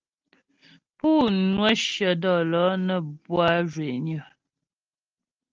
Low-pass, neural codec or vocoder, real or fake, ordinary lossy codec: 7.2 kHz; none; real; Opus, 24 kbps